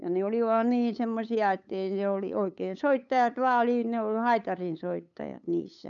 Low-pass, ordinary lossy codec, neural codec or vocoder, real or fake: 7.2 kHz; none; codec, 16 kHz, 8 kbps, FunCodec, trained on LibriTTS, 25 frames a second; fake